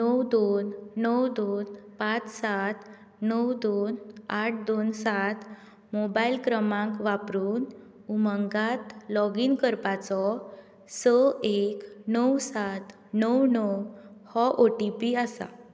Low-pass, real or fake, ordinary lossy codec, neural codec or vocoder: none; real; none; none